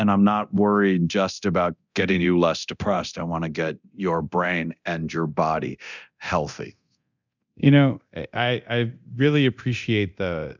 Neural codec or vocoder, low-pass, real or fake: codec, 24 kHz, 0.9 kbps, DualCodec; 7.2 kHz; fake